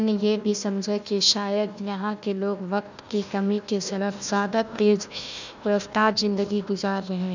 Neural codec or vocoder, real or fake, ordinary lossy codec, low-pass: codec, 16 kHz, 1 kbps, FunCodec, trained on Chinese and English, 50 frames a second; fake; none; 7.2 kHz